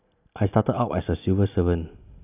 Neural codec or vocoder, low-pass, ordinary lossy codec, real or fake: none; 3.6 kHz; none; real